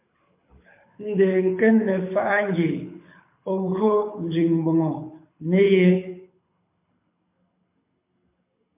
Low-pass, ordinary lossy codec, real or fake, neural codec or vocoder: 3.6 kHz; MP3, 24 kbps; fake; codec, 24 kHz, 6 kbps, HILCodec